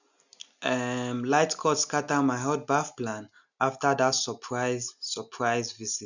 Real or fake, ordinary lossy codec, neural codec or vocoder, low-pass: real; none; none; 7.2 kHz